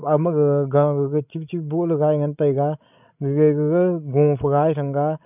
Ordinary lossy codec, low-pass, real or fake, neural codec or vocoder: none; 3.6 kHz; fake; codec, 16 kHz, 16 kbps, FreqCodec, larger model